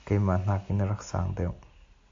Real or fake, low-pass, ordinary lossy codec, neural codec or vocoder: real; 7.2 kHz; AAC, 48 kbps; none